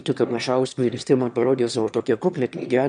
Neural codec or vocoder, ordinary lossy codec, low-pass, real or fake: autoencoder, 22.05 kHz, a latent of 192 numbers a frame, VITS, trained on one speaker; MP3, 96 kbps; 9.9 kHz; fake